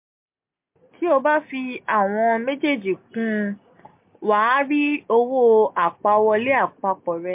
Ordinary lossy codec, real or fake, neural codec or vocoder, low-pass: MP3, 32 kbps; real; none; 3.6 kHz